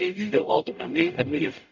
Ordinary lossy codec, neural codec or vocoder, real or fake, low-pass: none; codec, 44.1 kHz, 0.9 kbps, DAC; fake; 7.2 kHz